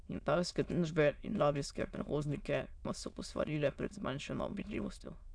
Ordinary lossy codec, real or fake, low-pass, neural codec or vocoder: none; fake; 9.9 kHz; autoencoder, 22.05 kHz, a latent of 192 numbers a frame, VITS, trained on many speakers